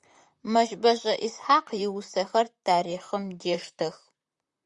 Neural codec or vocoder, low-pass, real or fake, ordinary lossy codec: vocoder, 44.1 kHz, 128 mel bands, Pupu-Vocoder; 10.8 kHz; fake; Opus, 64 kbps